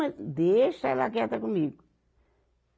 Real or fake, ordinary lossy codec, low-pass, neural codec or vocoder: real; none; none; none